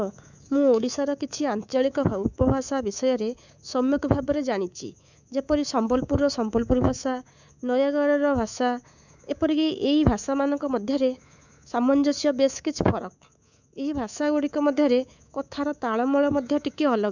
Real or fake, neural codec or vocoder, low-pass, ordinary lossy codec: fake; codec, 24 kHz, 3.1 kbps, DualCodec; 7.2 kHz; none